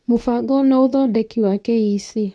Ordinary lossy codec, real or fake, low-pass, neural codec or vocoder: none; fake; none; codec, 24 kHz, 0.9 kbps, WavTokenizer, medium speech release version 2